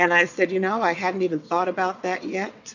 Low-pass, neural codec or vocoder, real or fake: 7.2 kHz; vocoder, 22.05 kHz, 80 mel bands, WaveNeXt; fake